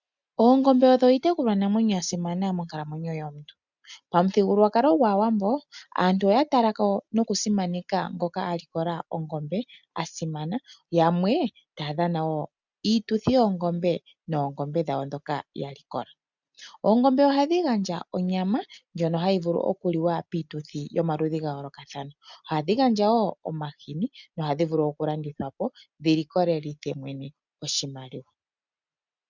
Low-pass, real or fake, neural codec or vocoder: 7.2 kHz; real; none